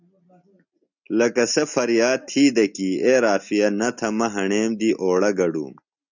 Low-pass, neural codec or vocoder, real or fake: 7.2 kHz; none; real